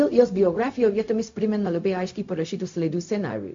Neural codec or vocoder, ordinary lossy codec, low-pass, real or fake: codec, 16 kHz, 0.4 kbps, LongCat-Audio-Codec; MP3, 48 kbps; 7.2 kHz; fake